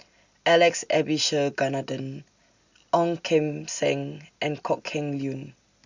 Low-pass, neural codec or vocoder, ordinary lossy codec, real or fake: 7.2 kHz; none; Opus, 64 kbps; real